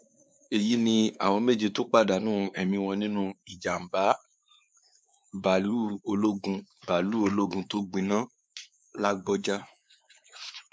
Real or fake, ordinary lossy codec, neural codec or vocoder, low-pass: fake; none; codec, 16 kHz, 4 kbps, X-Codec, WavLM features, trained on Multilingual LibriSpeech; none